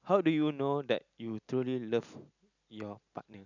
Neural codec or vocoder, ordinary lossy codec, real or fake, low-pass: none; none; real; 7.2 kHz